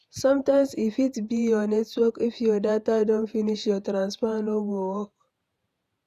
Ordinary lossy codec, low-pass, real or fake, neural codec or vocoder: none; 14.4 kHz; fake; vocoder, 48 kHz, 128 mel bands, Vocos